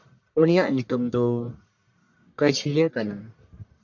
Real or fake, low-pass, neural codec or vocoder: fake; 7.2 kHz; codec, 44.1 kHz, 1.7 kbps, Pupu-Codec